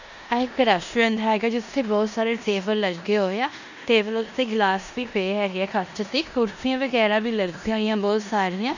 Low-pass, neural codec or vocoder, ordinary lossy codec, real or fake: 7.2 kHz; codec, 16 kHz in and 24 kHz out, 0.9 kbps, LongCat-Audio-Codec, four codebook decoder; none; fake